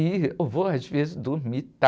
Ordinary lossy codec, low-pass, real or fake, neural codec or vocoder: none; none; real; none